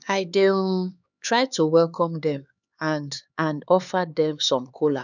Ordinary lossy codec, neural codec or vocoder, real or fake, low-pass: none; codec, 16 kHz, 4 kbps, X-Codec, HuBERT features, trained on LibriSpeech; fake; 7.2 kHz